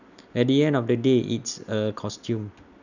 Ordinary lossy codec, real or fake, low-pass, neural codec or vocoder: none; real; 7.2 kHz; none